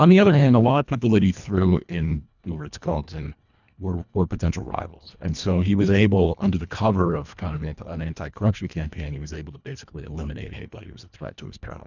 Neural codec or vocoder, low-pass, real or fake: codec, 24 kHz, 1.5 kbps, HILCodec; 7.2 kHz; fake